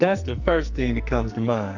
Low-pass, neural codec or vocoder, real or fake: 7.2 kHz; codec, 44.1 kHz, 2.6 kbps, SNAC; fake